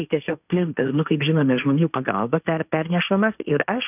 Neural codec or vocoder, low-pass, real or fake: codec, 16 kHz, 1.1 kbps, Voila-Tokenizer; 3.6 kHz; fake